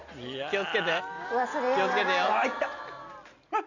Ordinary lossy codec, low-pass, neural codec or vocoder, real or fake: none; 7.2 kHz; none; real